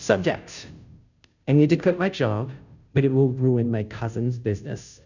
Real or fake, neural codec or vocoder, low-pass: fake; codec, 16 kHz, 0.5 kbps, FunCodec, trained on Chinese and English, 25 frames a second; 7.2 kHz